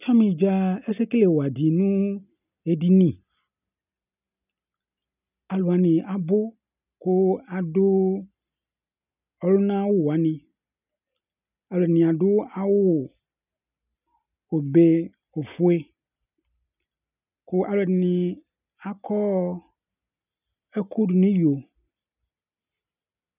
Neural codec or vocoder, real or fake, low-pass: none; real; 3.6 kHz